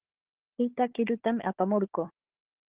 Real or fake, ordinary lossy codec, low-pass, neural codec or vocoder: fake; Opus, 16 kbps; 3.6 kHz; codec, 16 kHz, 4 kbps, FunCodec, trained on Chinese and English, 50 frames a second